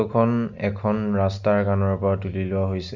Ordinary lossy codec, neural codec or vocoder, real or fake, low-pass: MP3, 64 kbps; none; real; 7.2 kHz